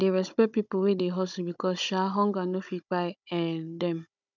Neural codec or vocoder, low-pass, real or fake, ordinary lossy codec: codec, 16 kHz, 16 kbps, FunCodec, trained on Chinese and English, 50 frames a second; 7.2 kHz; fake; none